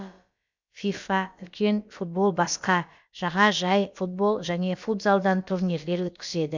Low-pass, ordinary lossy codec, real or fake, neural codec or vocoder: 7.2 kHz; MP3, 64 kbps; fake; codec, 16 kHz, about 1 kbps, DyCAST, with the encoder's durations